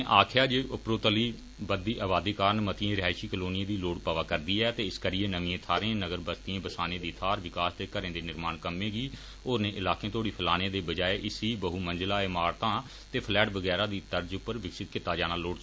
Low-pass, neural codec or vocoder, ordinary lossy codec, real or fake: none; none; none; real